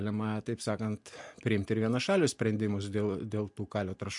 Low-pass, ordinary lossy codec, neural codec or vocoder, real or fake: 10.8 kHz; MP3, 64 kbps; none; real